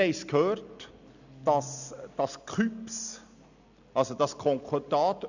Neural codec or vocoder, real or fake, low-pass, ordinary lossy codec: none; real; 7.2 kHz; none